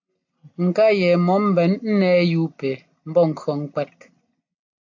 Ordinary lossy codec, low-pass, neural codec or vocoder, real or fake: AAC, 48 kbps; 7.2 kHz; none; real